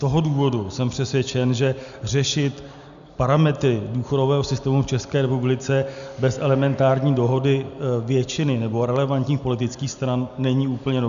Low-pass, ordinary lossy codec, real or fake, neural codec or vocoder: 7.2 kHz; MP3, 96 kbps; real; none